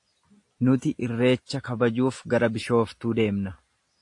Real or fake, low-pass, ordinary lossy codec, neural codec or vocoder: real; 10.8 kHz; AAC, 48 kbps; none